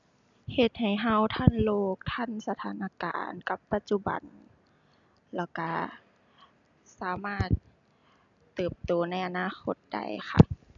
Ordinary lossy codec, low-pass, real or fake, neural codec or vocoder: none; 7.2 kHz; real; none